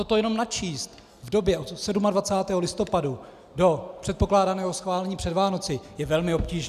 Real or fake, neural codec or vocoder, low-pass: real; none; 14.4 kHz